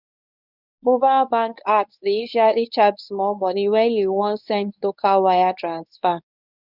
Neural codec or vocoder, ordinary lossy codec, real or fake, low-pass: codec, 24 kHz, 0.9 kbps, WavTokenizer, medium speech release version 1; none; fake; 5.4 kHz